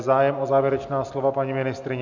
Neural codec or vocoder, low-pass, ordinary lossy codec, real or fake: none; 7.2 kHz; MP3, 64 kbps; real